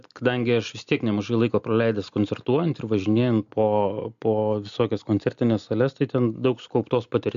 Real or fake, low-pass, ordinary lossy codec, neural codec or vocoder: real; 7.2 kHz; MP3, 64 kbps; none